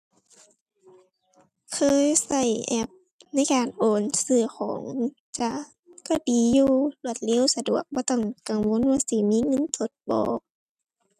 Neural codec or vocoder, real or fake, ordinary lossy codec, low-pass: none; real; none; 14.4 kHz